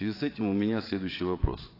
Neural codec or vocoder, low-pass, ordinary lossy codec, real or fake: vocoder, 22.05 kHz, 80 mel bands, Vocos; 5.4 kHz; AAC, 32 kbps; fake